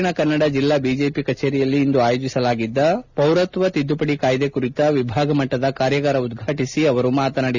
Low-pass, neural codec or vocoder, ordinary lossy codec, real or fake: 7.2 kHz; none; none; real